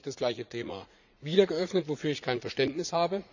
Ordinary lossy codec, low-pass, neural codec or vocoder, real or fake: none; 7.2 kHz; vocoder, 44.1 kHz, 80 mel bands, Vocos; fake